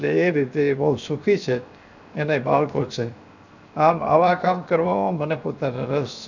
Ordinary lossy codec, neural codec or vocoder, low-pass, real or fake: none; codec, 16 kHz, 0.7 kbps, FocalCodec; 7.2 kHz; fake